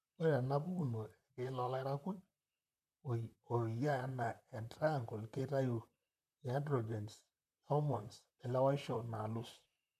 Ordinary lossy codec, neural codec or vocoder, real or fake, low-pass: none; vocoder, 44.1 kHz, 128 mel bands, Pupu-Vocoder; fake; 14.4 kHz